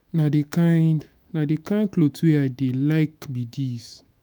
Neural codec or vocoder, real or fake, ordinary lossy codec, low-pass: autoencoder, 48 kHz, 128 numbers a frame, DAC-VAE, trained on Japanese speech; fake; none; none